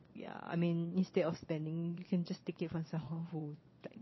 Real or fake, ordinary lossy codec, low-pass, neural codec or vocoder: fake; MP3, 24 kbps; 7.2 kHz; vocoder, 22.05 kHz, 80 mel bands, Vocos